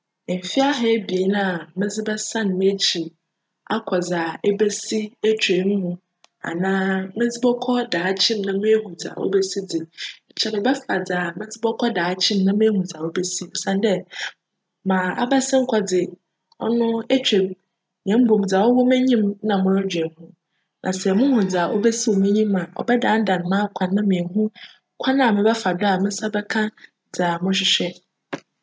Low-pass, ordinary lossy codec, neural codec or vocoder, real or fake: none; none; none; real